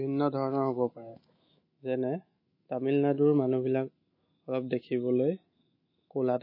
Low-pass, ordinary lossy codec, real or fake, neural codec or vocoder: 5.4 kHz; MP3, 24 kbps; fake; autoencoder, 48 kHz, 128 numbers a frame, DAC-VAE, trained on Japanese speech